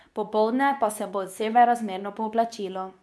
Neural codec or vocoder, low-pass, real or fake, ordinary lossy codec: codec, 24 kHz, 0.9 kbps, WavTokenizer, medium speech release version 2; none; fake; none